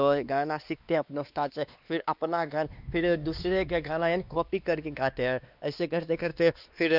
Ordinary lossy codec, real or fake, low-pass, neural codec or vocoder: none; fake; 5.4 kHz; codec, 16 kHz, 2 kbps, X-Codec, WavLM features, trained on Multilingual LibriSpeech